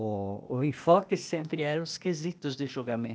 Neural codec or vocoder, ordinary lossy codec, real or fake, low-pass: codec, 16 kHz, 0.8 kbps, ZipCodec; none; fake; none